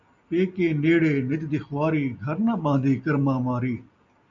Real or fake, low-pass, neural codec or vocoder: real; 7.2 kHz; none